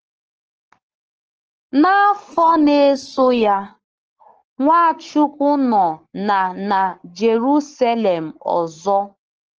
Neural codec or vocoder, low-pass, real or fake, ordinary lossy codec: codec, 44.1 kHz, 7.8 kbps, Pupu-Codec; 7.2 kHz; fake; Opus, 16 kbps